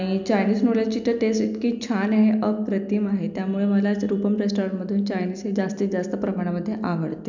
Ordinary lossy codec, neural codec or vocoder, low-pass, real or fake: none; none; 7.2 kHz; real